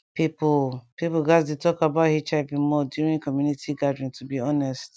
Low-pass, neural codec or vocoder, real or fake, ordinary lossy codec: none; none; real; none